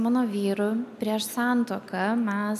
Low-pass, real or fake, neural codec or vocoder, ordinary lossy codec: 14.4 kHz; real; none; MP3, 96 kbps